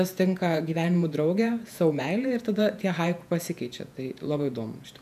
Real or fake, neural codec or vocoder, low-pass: fake; vocoder, 48 kHz, 128 mel bands, Vocos; 14.4 kHz